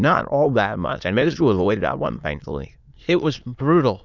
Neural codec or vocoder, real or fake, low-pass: autoencoder, 22.05 kHz, a latent of 192 numbers a frame, VITS, trained on many speakers; fake; 7.2 kHz